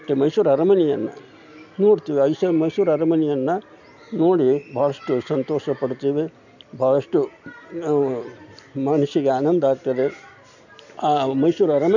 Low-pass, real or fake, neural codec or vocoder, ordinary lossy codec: 7.2 kHz; real; none; none